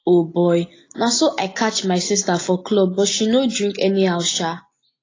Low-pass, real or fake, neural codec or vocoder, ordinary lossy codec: 7.2 kHz; real; none; AAC, 32 kbps